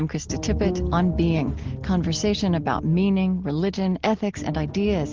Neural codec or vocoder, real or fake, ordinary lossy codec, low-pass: none; real; Opus, 16 kbps; 7.2 kHz